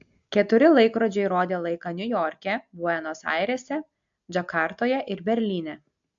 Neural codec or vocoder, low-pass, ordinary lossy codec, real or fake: none; 7.2 kHz; AAC, 64 kbps; real